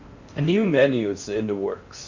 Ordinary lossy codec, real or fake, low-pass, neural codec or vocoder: Opus, 64 kbps; fake; 7.2 kHz; codec, 16 kHz in and 24 kHz out, 0.8 kbps, FocalCodec, streaming, 65536 codes